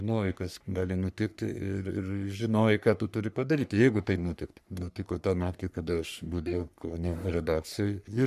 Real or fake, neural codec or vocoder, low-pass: fake; codec, 44.1 kHz, 3.4 kbps, Pupu-Codec; 14.4 kHz